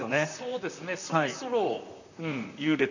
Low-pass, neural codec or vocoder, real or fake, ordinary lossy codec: 7.2 kHz; vocoder, 44.1 kHz, 128 mel bands, Pupu-Vocoder; fake; none